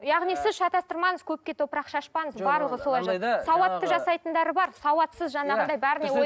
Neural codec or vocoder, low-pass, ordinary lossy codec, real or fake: none; none; none; real